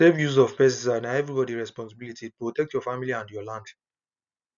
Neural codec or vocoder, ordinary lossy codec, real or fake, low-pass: none; none; real; 7.2 kHz